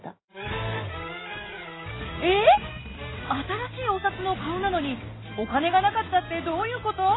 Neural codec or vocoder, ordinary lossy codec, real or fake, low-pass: none; AAC, 16 kbps; real; 7.2 kHz